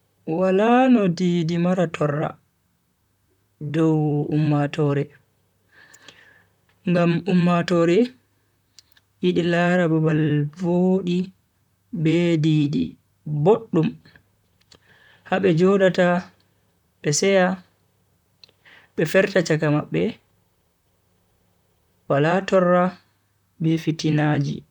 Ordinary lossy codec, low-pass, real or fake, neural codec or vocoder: none; 19.8 kHz; fake; vocoder, 44.1 kHz, 128 mel bands, Pupu-Vocoder